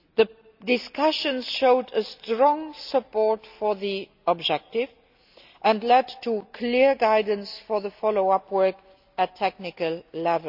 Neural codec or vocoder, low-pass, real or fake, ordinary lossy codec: none; 5.4 kHz; real; none